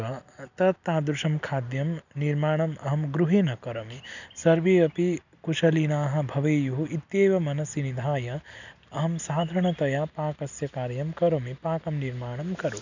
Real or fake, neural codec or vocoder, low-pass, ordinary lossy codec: real; none; 7.2 kHz; none